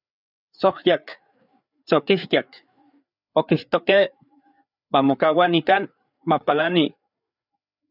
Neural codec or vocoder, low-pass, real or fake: codec, 16 kHz, 4 kbps, FreqCodec, larger model; 5.4 kHz; fake